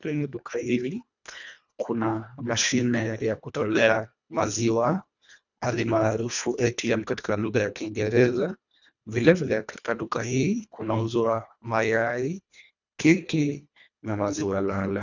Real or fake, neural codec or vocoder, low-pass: fake; codec, 24 kHz, 1.5 kbps, HILCodec; 7.2 kHz